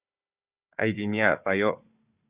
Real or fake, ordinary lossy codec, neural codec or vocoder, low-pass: fake; Opus, 64 kbps; codec, 16 kHz, 4 kbps, FunCodec, trained on Chinese and English, 50 frames a second; 3.6 kHz